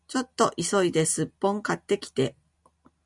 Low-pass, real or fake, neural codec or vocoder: 10.8 kHz; real; none